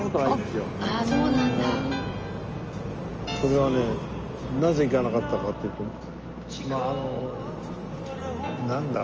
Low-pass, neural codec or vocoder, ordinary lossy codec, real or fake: 7.2 kHz; none; Opus, 24 kbps; real